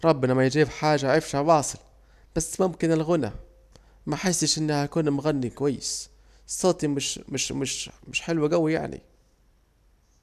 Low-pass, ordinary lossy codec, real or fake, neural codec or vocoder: 14.4 kHz; none; real; none